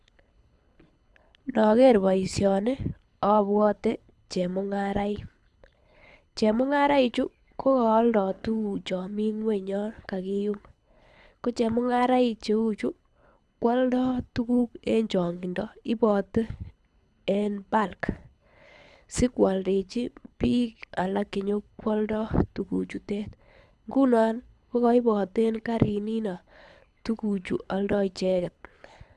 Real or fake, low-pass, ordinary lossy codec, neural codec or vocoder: fake; none; none; codec, 24 kHz, 6 kbps, HILCodec